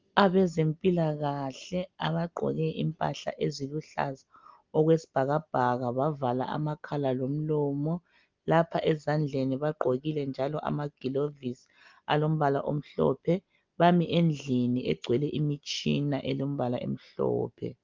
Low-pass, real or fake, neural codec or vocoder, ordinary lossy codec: 7.2 kHz; real; none; Opus, 32 kbps